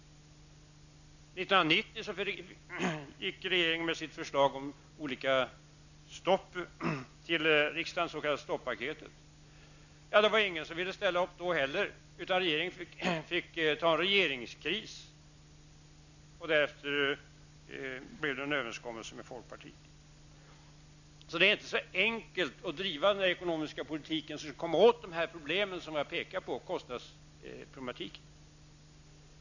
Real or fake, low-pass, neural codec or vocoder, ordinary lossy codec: real; 7.2 kHz; none; none